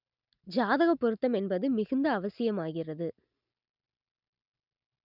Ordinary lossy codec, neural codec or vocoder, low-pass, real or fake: none; none; 5.4 kHz; real